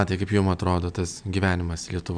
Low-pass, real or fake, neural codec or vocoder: 9.9 kHz; real; none